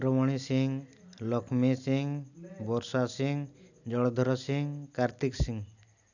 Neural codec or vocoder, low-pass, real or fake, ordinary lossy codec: none; 7.2 kHz; real; none